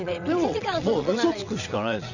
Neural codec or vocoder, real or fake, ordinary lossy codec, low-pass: vocoder, 22.05 kHz, 80 mel bands, WaveNeXt; fake; none; 7.2 kHz